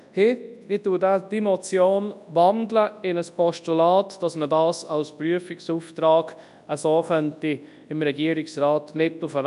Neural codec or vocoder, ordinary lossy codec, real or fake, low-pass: codec, 24 kHz, 0.9 kbps, WavTokenizer, large speech release; none; fake; 10.8 kHz